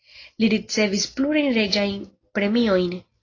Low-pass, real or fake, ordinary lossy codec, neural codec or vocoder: 7.2 kHz; real; AAC, 32 kbps; none